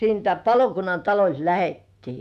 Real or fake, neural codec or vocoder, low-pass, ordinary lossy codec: fake; autoencoder, 48 kHz, 128 numbers a frame, DAC-VAE, trained on Japanese speech; 14.4 kHz; none